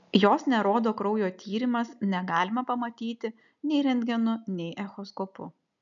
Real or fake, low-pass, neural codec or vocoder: real; 7.2 kHz; none